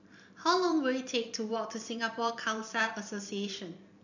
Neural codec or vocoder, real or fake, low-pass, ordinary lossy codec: vocoder, 44.1 kHz, 128 mel bands every 512 samples, BigVGAN v2; fake; 7.2 kHz; none